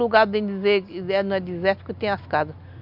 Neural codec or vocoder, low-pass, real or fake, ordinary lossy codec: none; 5.4 kHz; real; none